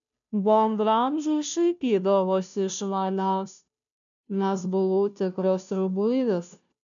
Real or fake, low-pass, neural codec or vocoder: fake; 7.2 kHz; codec, 16 kHz, 0.5 kbps, FunCodec, trained on Chinese and English, 25 frames a second